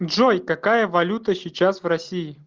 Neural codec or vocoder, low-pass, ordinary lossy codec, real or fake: none; 7.2 kHz; Opus, 32 kbps; real